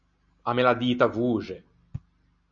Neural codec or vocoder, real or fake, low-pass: none; real; 7.2 kHz